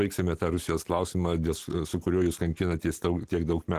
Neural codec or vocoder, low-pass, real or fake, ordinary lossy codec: none; 14.4 kHz; real; Opus, 16 kbps